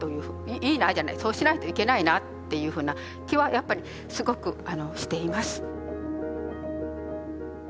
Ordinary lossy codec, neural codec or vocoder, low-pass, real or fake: none; none; none; real